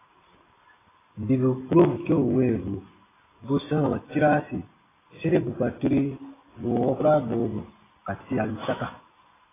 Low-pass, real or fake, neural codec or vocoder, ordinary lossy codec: 3.6 kHz; real; none; AAC, 16 kbps